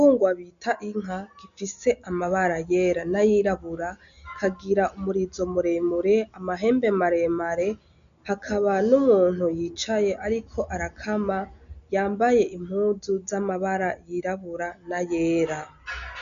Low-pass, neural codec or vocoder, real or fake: 7.2 kHz; none; real